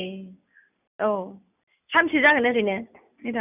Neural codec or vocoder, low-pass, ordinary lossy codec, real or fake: none; 3.6 kHz; none; real